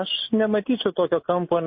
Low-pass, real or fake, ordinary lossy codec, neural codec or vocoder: 7.2 kHz; real; MP3, 24 kbps; none